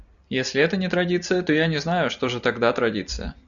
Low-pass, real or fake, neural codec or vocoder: 7.2 kHz; real; none